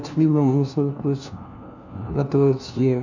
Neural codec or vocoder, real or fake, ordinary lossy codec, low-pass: codec, 16 kHz, 1 kbps, FunCodec, trained on LibriTTS, 50 frames a second; fake; none; 7.2 kHz